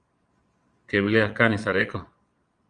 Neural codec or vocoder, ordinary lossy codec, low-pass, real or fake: vocoder, 22.05 kHz, 80 mel bands, Vocos; Opus, 32 kbps; 9.9 kHz; fake